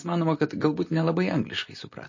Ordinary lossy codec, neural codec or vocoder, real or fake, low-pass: MP3, 32 kbps; none; real; 7.2 kHz